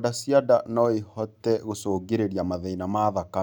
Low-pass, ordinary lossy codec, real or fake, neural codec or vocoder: none; none; real; none